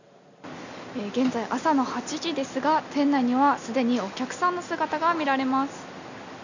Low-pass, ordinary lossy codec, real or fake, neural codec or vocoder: 7.2 kHz; none; real; none